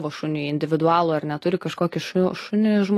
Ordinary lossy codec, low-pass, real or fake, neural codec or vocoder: AAC, 48 kbps; 14.4 kHz; real; none